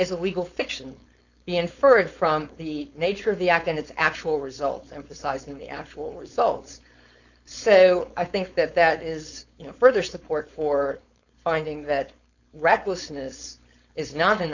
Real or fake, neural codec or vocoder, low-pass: fake; codec, 16 kHz, 4.8 kbps, FACodec; 7.2 kHz